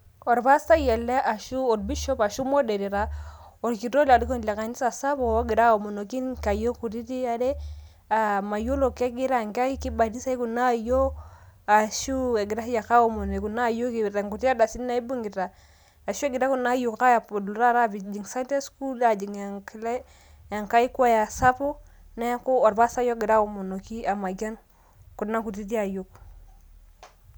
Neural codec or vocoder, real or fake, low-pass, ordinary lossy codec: none; real; none; none